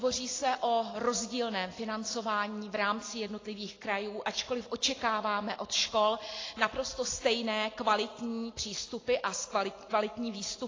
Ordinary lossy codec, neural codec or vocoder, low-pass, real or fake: AAC, 32 kbps; none; 7.2 kHz; real